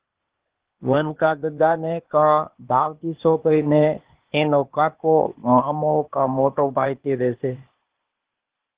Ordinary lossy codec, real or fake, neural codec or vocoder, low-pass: Opus, 16 kbps; fake; codec, 16 kHz, 0.8 kbps, ZipCodec; 3.6 kHz